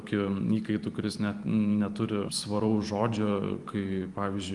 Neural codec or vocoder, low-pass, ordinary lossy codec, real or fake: vocoder, 44.1 kHz, 128 mel bands every 512 samples, BigVGAN v2; 10.8 kHz; Opus, 32 kbps; fake